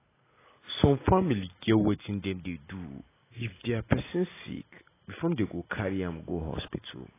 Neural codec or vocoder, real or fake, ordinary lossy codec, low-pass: none; real; AAC, 16 kbps; 3.6 kHz